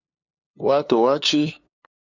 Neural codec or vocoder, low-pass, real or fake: codec, 16 kHz, 2 kbps, FunCodec, trained on LibriTTS, 25 frames a second; 7.2 kHz; fake